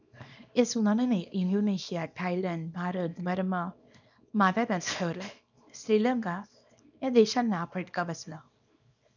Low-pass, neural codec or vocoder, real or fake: 7.2 kHz; codec, 24 kHz, 0.9 kbps, WavTokenizer, small release; fake